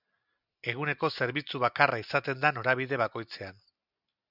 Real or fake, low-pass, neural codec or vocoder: real; 5.4 kHz; none